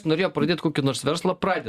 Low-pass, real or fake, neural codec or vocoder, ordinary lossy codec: 14.4 kHz; real; none; Opus, 64 kbps